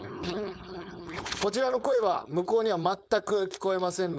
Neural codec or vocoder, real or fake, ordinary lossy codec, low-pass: codec, 16 kHz, 4.8 kbps, FACodec; fake; none; none